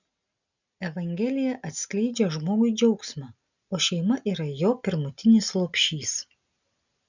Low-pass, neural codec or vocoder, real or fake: 7.2 kHz; none; real